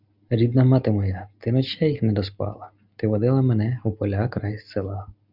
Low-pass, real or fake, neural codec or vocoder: 5.4 kHz; real; none